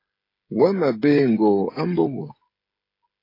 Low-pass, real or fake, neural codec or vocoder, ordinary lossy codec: 5.4 kHz; fake; codec, 16 kHz, 16 kbps, FreqCodec, smaller model; AAC, 32 kbps